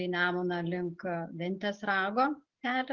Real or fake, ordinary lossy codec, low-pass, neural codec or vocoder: real; Opus, 16 kbps; 7.2 kHz; none